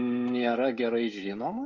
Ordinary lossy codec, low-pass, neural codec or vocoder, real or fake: Opus, 32 kbps; 7.2 kHz; codec, 16 kHz, 16 kbps, FreqCodec, smaller model; fake